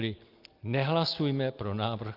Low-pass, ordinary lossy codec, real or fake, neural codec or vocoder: 5.4 kHz; Opus, 32 kbps; real; none